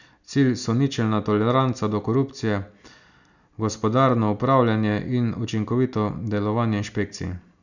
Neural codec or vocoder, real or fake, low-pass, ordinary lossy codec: none; real; 7.2 kHz; none